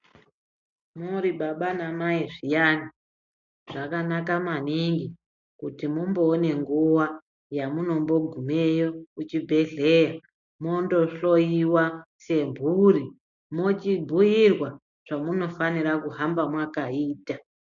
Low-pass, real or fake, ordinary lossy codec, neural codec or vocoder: 7.2 kHz; real; MP3, 64 kbps; none